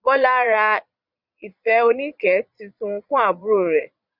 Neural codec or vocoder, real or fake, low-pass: vocoder, 22.05 kHz, 80 mel bands, Vocos; fake; 5.4 kHz